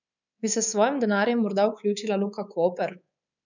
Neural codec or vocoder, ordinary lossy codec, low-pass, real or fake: codec, 24 kHz, 3.1 kbps, DualCodec; none; 7.2 kHz; fake